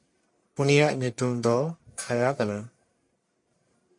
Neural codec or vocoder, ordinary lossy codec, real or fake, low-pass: codec, 44.1 kHz, 1.7 kbps, Pupu-Codec; MP3, 48 kbps; fake; 10.8 kHz